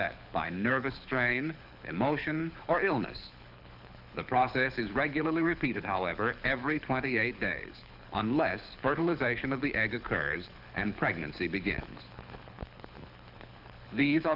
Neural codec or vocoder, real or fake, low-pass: codec, 24 kHz, 6 kbps, HILCodec; fake; 5.4 kHz